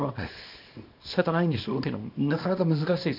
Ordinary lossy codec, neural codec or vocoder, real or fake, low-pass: AAC, 48 kbps; codec, 24 kHz, 0.9 kbps, WavTokenizer, small release; fake; 5.4 kHz